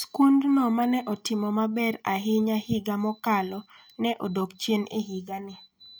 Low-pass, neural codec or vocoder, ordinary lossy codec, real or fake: none; none; none; real